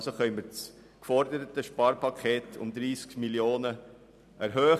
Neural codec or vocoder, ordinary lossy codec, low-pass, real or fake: none; none; 14.4 kHz; real